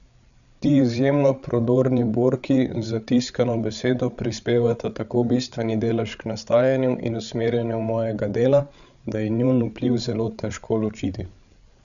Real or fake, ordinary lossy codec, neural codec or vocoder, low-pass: fake; none; codec, 16 kHz, 8 kbps, FreqCodec, larger model; 7.2 kHz